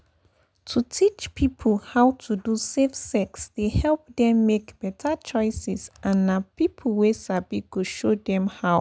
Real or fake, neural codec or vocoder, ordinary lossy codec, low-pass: real; none; none; none